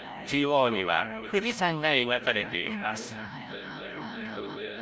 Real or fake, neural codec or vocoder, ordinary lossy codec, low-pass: fake; codec, 16 kHz, 0.5 kbps, FreqCodec, larger model; none; none